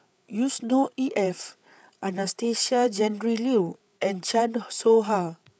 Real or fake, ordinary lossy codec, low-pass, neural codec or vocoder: fake; none; none; codec, 16 kHz, 8 kbps, FreqCodec, larger model